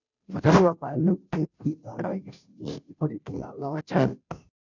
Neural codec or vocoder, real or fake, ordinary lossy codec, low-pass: codec, 16 kHz, 0.5 kbps, FunCodec, trained on Chinese and English, 25 frames a second; fake; none; 7.2 kHz